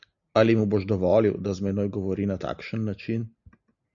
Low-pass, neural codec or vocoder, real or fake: 7.2 kHz; none; real